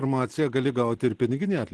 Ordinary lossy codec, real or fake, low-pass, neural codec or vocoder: Opus, 16 kbps; real; 10.8 kHz; none